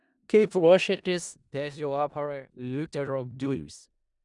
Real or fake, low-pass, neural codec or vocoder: fake; 10.8 kHz; codec, 16 kHz in and 24 kHz out, 0.4 kbps, LongCat-Audio-Codec, four codebook decoder